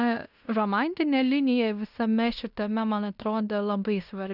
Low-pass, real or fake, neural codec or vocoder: 5.4 kHz; fake; codec, 16 kHz in and 24 kHz out, 0.9 kbps, LongCat-Audio-Codec, fine tuned four codebook decoder